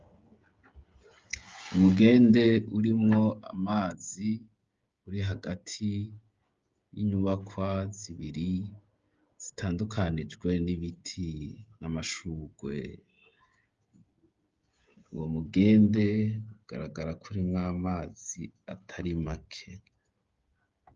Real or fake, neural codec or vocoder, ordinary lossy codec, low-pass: fake; codec, 16 kHz, 16 kbps, FreqCodec, smaller model; Opus, 32 kbps; 7.2 kHz